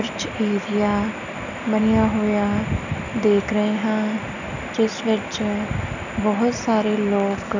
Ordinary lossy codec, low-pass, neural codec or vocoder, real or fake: none; 7.2 kHz; none; real